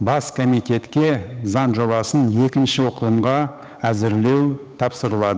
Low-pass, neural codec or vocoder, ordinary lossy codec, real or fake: none; codec, 16 kHz, 8 kbps, FunCodec, trained on Chinese and English, 25 frames a second; none; fake